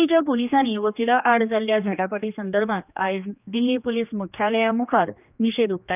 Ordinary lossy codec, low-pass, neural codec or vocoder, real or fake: none; 3.6 kHz; codec, 16 kHz, 2 kbps, X-Codec, HuBERT features, trained on general audio; fake